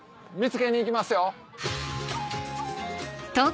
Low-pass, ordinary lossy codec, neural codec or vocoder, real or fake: none; none; none; real